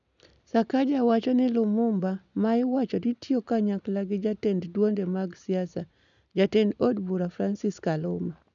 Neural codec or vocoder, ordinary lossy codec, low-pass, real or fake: none; none; 7.2 kHz; real